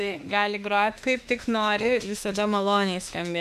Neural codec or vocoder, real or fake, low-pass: autoencoder, 48 kHz, 32 numbers a frame, DAC-VAE, trained on Japanese speech; fake; 14.4 kHz